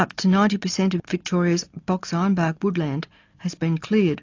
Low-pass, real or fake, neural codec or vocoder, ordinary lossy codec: 7.2 kHz; real; none; AAC, 48 kbps